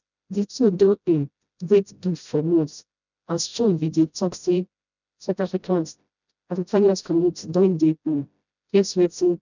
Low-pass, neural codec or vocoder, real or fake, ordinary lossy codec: 7.2 kHz; codec, 16 kHz, 0.5 kbps, FreqCodec, smaller model; fake; none